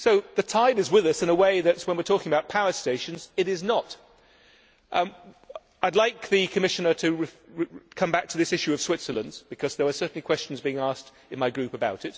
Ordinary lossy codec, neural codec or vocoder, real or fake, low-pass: none; none; real; none